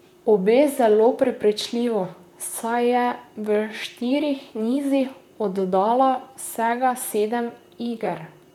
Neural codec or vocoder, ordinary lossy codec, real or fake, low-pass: vocoder, 44.1 kHz, 128 mel bands, Pupu-Vocoder; none; fake; 19.8 kHz